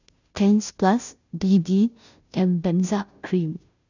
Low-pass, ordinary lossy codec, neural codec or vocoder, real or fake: 7.2 kHz; none; codec, 16 kHz, 0.5 kbps, FunCodec, trained on Chinese and English, 25 frames a second; fake